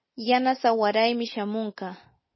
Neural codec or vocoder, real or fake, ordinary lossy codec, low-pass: none; real; MP3, 24 kbps; 7.2 kHz